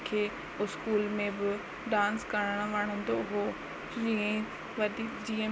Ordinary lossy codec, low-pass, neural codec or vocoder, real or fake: none; none; none; real